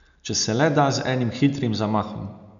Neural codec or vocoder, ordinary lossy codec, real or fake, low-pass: none; none; real; 7.2 kHz